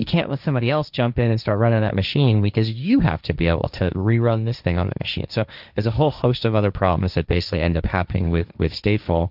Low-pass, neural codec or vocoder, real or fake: 5.4 kHz; codec, 16 kHz, 1.1 kbps, Voila-Tokenizer; fake